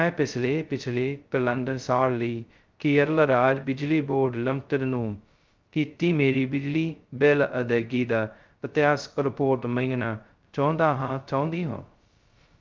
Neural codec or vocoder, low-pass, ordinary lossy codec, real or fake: codec, 16 kHz, 0.2 kbps, FocalCodec; 7.2 kHz; Opus, 24 kbps; fake